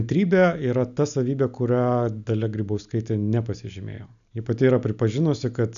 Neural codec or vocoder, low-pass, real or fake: none; 7.2 kHz; real